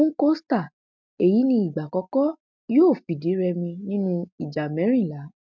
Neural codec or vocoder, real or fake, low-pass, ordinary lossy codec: none; real; 7.2 kHz; MP3, 64 kbps